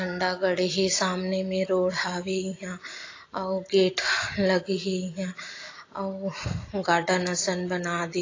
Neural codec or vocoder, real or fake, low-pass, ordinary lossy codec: none; real; 7.2 kHz; AAC, 32 kbps